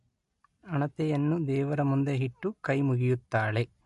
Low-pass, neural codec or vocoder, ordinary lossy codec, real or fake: 14.4 kHz; none; MP3, 48 kbps; real